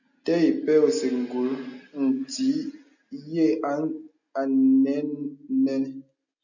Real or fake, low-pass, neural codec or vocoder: real; 7.2 kHz; none